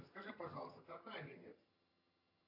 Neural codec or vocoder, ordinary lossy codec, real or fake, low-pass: vocoder, 22.05 kHz, 80 mel bands, HiFi-GAN; AAC, 32 kbps; fake; 5.4 kHz